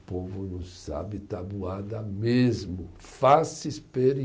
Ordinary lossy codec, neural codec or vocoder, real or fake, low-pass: none; none; real; none